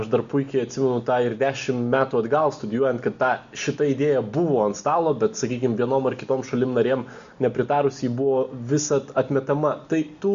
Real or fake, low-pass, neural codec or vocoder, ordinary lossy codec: real; 7.2 kHz; none; Opus, 64 kbps